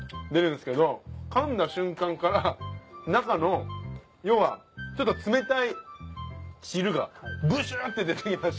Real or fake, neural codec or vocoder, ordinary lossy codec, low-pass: real; none; none; none